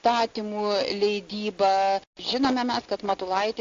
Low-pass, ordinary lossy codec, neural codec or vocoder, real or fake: 7.2 kHz; AAC, 48 kbps; none; real